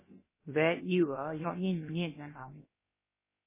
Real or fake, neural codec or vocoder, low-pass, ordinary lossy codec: fake; codec, 16 kHz, about 1 kbps, DyCAST, with the encoder's durations; 3.6 kHz; MP3, 16 kbps